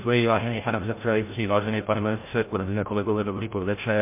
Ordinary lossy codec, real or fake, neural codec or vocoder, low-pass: MP3, 24 kbps; fake; codec, 16 kHz, 0.5 kbps, FreqCodec, larger model; 3.6 kHz